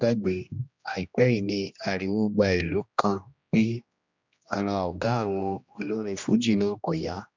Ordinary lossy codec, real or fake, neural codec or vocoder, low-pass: MP3, 64 kbps; fake; codec, 16 kHz, 1 kbps, X-Codec, HuBERT features, trained on general audio; 7.2 kHz